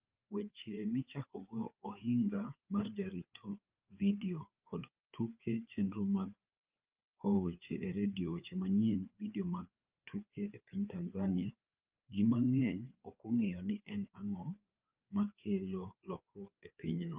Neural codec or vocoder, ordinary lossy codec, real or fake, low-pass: codec, 16 kHz, 4 kbps, FreqCodec, larger model; Opus, 24 kbps; fake; 3.6 kHz